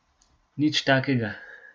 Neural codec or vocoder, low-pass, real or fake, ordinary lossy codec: none; none; real; none